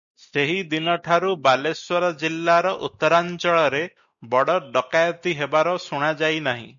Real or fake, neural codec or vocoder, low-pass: real; none; 7.2 kHz